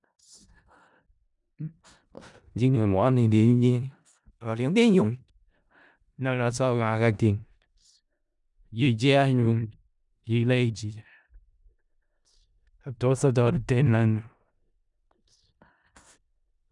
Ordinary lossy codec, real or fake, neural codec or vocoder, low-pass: none; fake; codec, 16 kHz in and 24 kHz out, 0.4 kbps, LongCat-Audio-Codec, four codebook decoder; 10.8 kHz